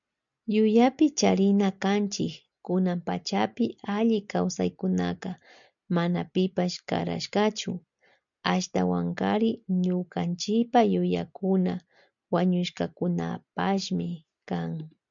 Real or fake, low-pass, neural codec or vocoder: real; 7.2 kHz; none